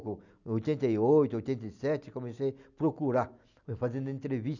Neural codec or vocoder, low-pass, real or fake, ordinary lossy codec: none; 7.2 kHz; real; none